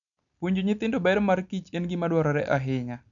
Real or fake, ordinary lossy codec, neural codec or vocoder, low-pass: real; none; none; 7.2 kHz